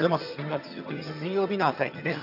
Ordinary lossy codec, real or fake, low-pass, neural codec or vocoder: none; fake; 5.4 kHz; vocoder, 22.05 kHz, 80 mel bands, HiFi-GAN